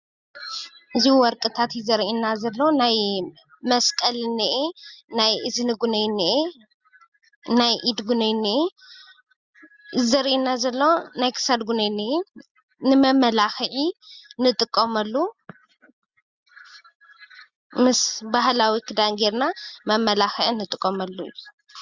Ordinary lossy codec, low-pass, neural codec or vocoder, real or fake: Opus, 64 kbps; 7.2 kHz; none; real